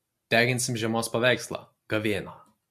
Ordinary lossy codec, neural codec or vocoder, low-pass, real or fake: MP3, 64 kbps; none; 14.4 kHz; real